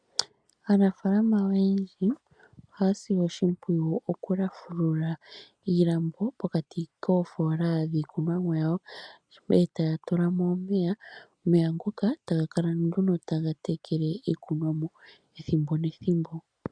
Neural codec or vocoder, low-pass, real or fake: none; 9.9 kHz; real